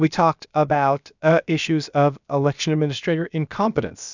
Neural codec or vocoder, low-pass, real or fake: codec, 16 kHz, about 1 kbps, DyCAST, with the encoder's durations; 7.2 kHz; fake